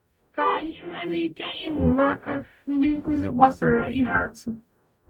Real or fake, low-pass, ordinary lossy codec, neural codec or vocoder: fake; 19.8 kHz; none; codec, 44.1 kHz, 0.9 kbps, DAC